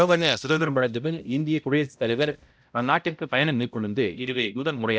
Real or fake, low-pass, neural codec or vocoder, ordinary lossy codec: fake; none; codec, 16 kHz, 0.5 kbps, X-Codec, HuBERT features, trained on balanced general audio; none